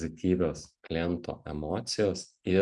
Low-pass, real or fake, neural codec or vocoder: 10.8 kHz; real; none